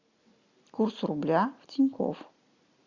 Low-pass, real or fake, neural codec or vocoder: 7.2 kHz; real; none